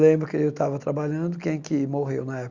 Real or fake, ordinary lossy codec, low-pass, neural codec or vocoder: real; Opus, 64 kbps; 7.2 kHz; none